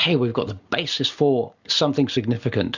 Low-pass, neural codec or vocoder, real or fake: 7.2 kHz; none; real